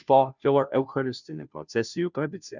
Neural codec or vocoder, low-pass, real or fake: codec, 16 kHz, 0.5 kbps, FunCodec, trained on Chinese and English, 25 frames a second; 7.2 kHz; fake